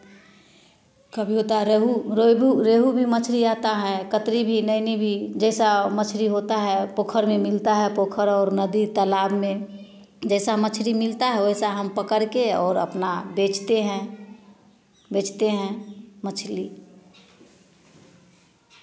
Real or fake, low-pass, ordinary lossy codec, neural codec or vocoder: real; none; none; none